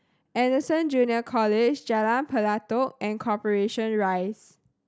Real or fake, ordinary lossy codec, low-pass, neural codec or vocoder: real; none; none; none